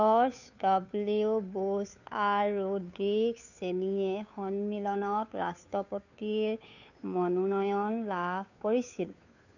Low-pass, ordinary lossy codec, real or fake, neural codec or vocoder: 7.2 kHz; none; fake; codec, 16 kHz, 2 kbps, FunCodec, trained on Chinese and English, 25 frames a second